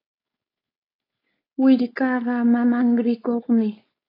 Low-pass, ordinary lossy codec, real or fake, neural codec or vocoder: 5.4 kHz; AAC, 24 kbps; fake; codec, 16 kHz, 4.8 kbps, FACodec